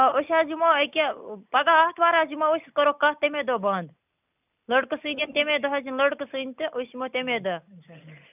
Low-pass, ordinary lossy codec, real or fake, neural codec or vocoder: 3.6 kHz; none; real; none